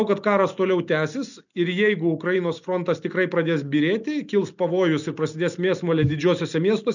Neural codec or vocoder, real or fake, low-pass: none; real; 7.2 kHz